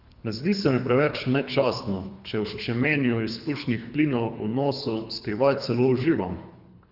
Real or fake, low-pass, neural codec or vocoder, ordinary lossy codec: fake; 5.4 kHz; codec, 24 kHz, 3 kbps, HILCodec; Opus, 64 kbps